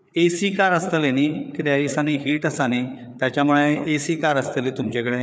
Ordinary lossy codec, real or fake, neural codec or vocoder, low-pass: none; fake; codec, 16 kHz, 4 kbps, FreqCodec, larger model; none